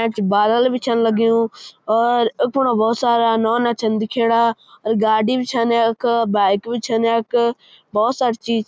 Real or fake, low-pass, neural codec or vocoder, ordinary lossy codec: real; none; none; none